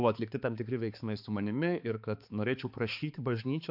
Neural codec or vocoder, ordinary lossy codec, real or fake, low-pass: codec, 16 kHz, 4 kbps, X-Codec, HuBERT features, trained on balanced general audio; AAC, 48 kbps; fake; 5.4 kHz